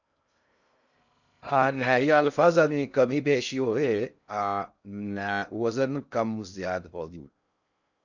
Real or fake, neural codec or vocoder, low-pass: fake; codec, 16 kHz in and 24 kHz out, 0.6 kbps, FocalCodec, streaming, 2048 codes; 7.2 kHz